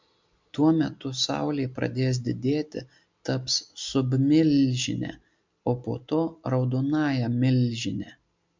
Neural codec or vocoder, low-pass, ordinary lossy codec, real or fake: none; 7.2 kHz; MP3, 64 kbps; real